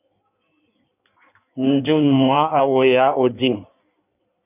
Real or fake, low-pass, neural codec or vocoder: fake; 3.6 kHz; codec, 16 kHz in and 24 kHz out, 1.1 kbps, FireRedTTS-2 codec